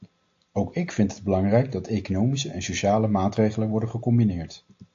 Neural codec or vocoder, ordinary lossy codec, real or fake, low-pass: none; AAC, 48 kbps; real; 7.2 kHz